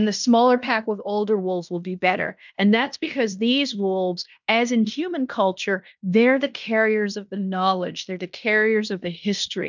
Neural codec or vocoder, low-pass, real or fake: codec, 16 kHz in and 24 kHz out, 0.9 kbps, LongCat-Audio-Codec, fine tuned four codebook decoder; 7.2 kHz; fake